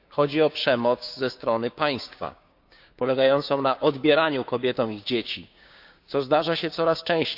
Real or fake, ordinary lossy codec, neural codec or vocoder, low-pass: fake; none; codec, 16 kHz, 6 kbps, DAC; 5.4 kHz